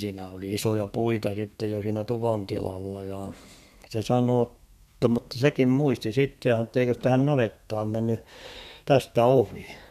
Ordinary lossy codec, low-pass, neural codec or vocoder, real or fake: none; 14.4 kHz; codec, 32 kHz, 1.9 kbps, SNAC; fake